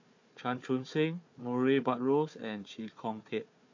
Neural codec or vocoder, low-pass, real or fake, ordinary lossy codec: codec, 16 kHz, 4 kbps, FunCodec, trained on Chinese and English, 50 frames a second; 7.2 kHz; fake; MP3, 48 kbps